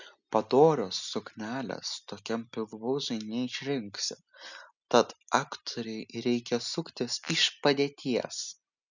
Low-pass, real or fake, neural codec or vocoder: 7.2 kHz; real; none